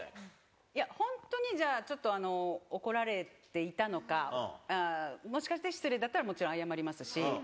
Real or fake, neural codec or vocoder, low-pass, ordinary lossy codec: real; none; none; none